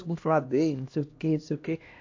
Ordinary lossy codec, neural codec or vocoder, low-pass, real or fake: AAC, 48 kbps; codec, 16 kHz, 1 kbps, X-Codec, HuBERT features, trained on LibriSpeech; 7.2 kHz; fake